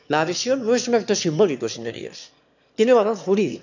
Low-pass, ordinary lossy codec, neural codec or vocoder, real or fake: 7.2 kHz; none; autoencoder, 22.05 kHz, a latent of 192 numbers a frame, VITS, trained on one speaker; fake